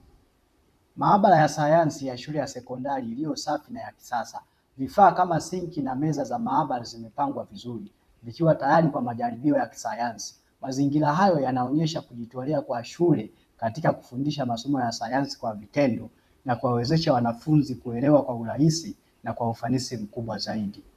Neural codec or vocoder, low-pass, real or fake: vocoder, 44.1 kHz, 128 mel bands, Pupu-Vocoder; 14.4 kHz; fake